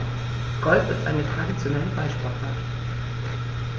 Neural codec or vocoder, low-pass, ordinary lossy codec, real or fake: none; 7.2 kHz; Opus, 24 kbps; real